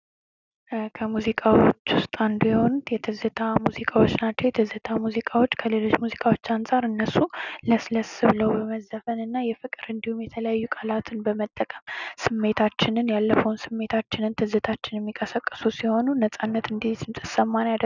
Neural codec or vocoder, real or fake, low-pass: none; real; 7.2 kHz